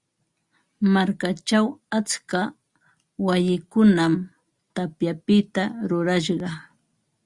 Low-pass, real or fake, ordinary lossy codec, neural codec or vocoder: 10.8 kHz; real; Opus, 64 kbps; none